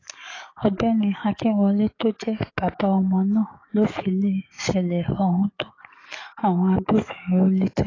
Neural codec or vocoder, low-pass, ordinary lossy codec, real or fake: codec, 44.1 kHz, 7.8 kbps, DAC; 7.2 kHz; AAC, 32 kbps; fake